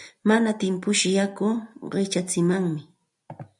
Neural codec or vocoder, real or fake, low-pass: none; real; 10.8 kHz